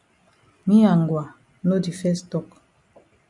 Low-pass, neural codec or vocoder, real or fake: 10.8 kHz; none; real